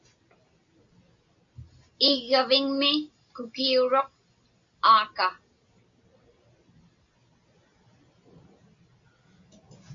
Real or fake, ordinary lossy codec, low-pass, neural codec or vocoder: real; MP3, 48 kbps; 7.2 kHz; none